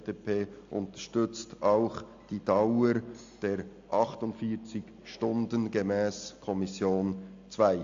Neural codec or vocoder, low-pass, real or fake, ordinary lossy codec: none; 7.2 kHz; real; AAC, 64 kbps